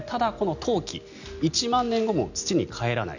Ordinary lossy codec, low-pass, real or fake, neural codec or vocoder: none; 7.2 kHz; real; none